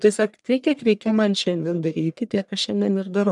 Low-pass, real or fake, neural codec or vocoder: 10.8 kHz; fake; codec, 44.1 kHz, 1.7 kbps, Pupu-Codec